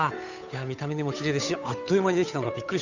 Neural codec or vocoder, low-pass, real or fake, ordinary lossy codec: codec, 16 kHz, 8 kbps, FunCodec, trained on Chinese and English, 25 frames a second; 7.2 kHz; fake; none